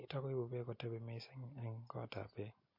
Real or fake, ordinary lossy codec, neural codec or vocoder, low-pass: real; MP3, 32 kbps; none; 5.4 kHz